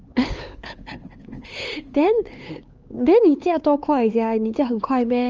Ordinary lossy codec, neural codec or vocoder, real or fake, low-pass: Opus, 24 kbps; codec, 16 kHz, 4 kbps, X-Codec, HuBERT features, trained on LibriSpeech; fake; 7.2 kHz